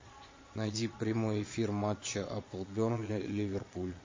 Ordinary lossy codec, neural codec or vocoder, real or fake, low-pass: MP3, 32 kbps; vocoder, 22.05 kHz, 80 mel bands, Vocos; fake; 7.2 kHz